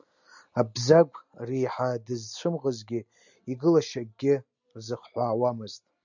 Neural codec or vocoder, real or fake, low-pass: none; real; 7.2 kHz